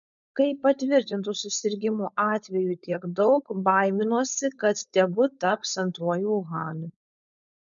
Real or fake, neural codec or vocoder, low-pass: fake; codec, 16 kHz, 4.8 kbps, FACodec; 7.2 kHz